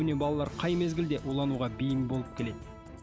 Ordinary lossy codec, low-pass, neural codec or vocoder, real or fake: none; none; none; real